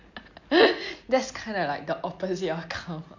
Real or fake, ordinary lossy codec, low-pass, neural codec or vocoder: real; MP3, 48 kbps; 7.2 kHz; none